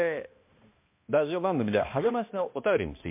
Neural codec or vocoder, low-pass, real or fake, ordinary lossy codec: codec, 16 kHz, 1 kbps, X-Codec, HuBERT features, trained on balanced general audio; 3.6 kHz; fake; MP3, 24 kbps